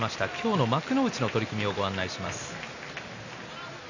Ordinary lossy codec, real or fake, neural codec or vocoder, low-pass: none; real; none; 7.2 kHz